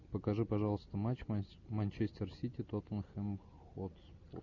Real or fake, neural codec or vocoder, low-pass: real; none; 7.2 kHz